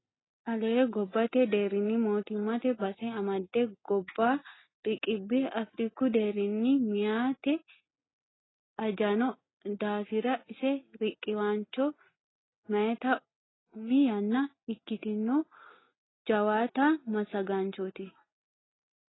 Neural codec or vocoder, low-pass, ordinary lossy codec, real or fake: none; 7.2 kHz; AAC, 16 kbps; real